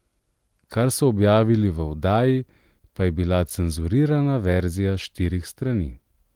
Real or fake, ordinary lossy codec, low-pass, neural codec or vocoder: real; Opus, 24 kbps; 19.8 kHz; none